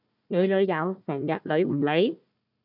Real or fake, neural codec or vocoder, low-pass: fake; codec, 16 kHz, 1 kbps, FunCodec, trained on Chinese and English, 50 frames a second; 5.4 kHz